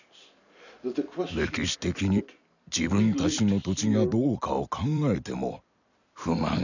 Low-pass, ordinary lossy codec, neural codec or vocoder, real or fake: 7.2 kHz; none; vocoder, 44.1 kHz, 128 mel bands every 256 samples, BigVGAN v2; fake